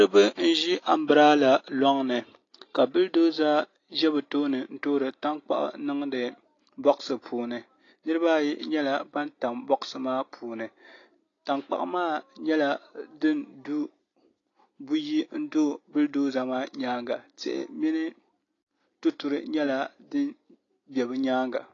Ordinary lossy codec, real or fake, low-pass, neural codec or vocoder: AAC, 32 kbps; real; 7.2 kHz; none